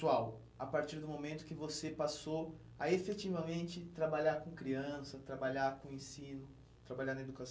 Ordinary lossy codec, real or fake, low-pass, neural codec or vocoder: none; real; none; none